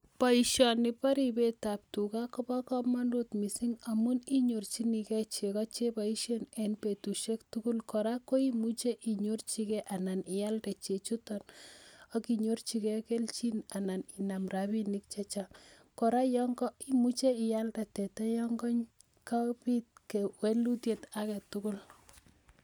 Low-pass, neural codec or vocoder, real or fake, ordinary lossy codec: none; none; real; none